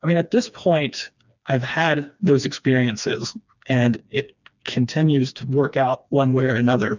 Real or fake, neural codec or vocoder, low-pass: fake; codec, 16 kHz, 2 kbps, FreqCodec, smaller model; 7.2 kHz